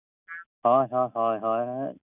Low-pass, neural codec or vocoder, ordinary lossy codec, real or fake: 3.6 kHz; none; none; real